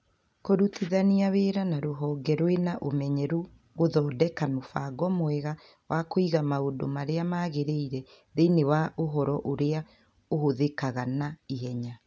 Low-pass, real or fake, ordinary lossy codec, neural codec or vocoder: none; real; none; none